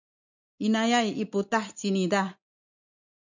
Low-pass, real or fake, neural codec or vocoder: 7.2 kHz; real; none